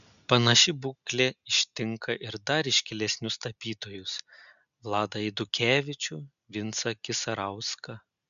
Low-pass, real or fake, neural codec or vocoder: 7.2 kHz; real; none